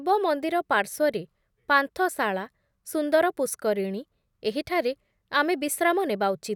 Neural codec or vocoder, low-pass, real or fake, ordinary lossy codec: none; 19.8 kHz; real; none